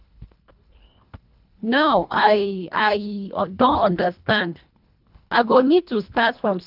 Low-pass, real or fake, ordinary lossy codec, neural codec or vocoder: 5.4 kHz; fake; none; codec, 24 kHz, 1.5 kbps, HILCodec